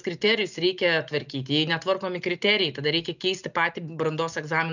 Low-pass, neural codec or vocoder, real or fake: 7.2 kHz; none; real